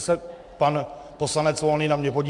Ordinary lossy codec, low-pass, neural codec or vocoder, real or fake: MP3, 64 kbps; 10.8 kHz; vocoder, 44.1 kHz, 128 mel bands every 256 samples, BigVGAN v2; fake